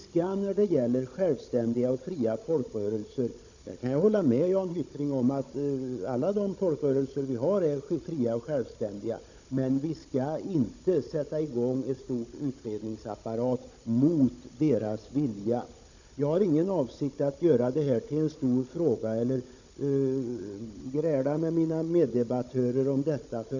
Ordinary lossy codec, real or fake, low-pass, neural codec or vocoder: none; fake; 7.2 kHz; codec, 16 kHz, 16 kbps, FunCodec, trained on Chinese and English, 50 frames a second